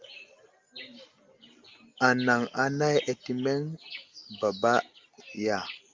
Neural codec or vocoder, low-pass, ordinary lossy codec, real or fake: none; 7.2 kHz; Opus, 24 kbps; real